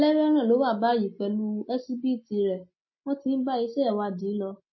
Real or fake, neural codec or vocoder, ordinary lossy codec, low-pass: real; none; MP3, 24 kbps; 7.2 kHz